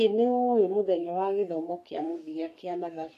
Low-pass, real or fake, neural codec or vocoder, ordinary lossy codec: 14.4 kHz; fake; codec, 44.1 kHz, 3.4 kbps, Pupu-Codec; AAC, 64 kbps